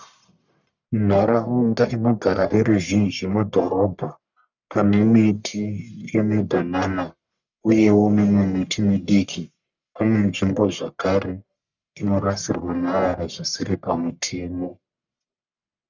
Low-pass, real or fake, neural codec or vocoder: 7.2 kHz; fake; codec, 44.1 kHz, 1.7 kbps, Pupu-Codec